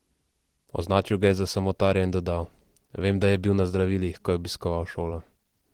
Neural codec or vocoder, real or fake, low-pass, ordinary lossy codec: vocoder, 44.1 kHz, 128 mel bands every 512 samples, BigVGAN v2; fake; 19.8 kHz; Opus, 16 kbps